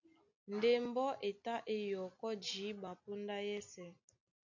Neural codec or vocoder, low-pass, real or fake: none; 7.2 kHz; real